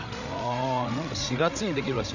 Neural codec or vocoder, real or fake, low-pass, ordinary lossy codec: codec, 16 kHz, 16 kbps, FreqCodec, larger model; fake; 7.2 kHz; none